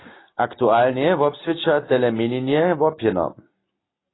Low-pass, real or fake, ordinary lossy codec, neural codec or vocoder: 7.2 kHz; real; AAC, 16 kbps; none